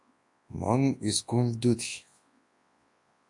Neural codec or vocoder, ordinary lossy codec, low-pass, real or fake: codec, 24 kHz, 0.9 kbps, WavTokenizer, large speech release; AAC, 64 kbps; 10.8 kHz; fake